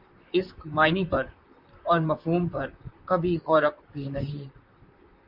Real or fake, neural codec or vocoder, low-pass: fake; vocoder, 44.1 kHz, 128 mel bands, Pupu-Vocoder; 5.4 kHz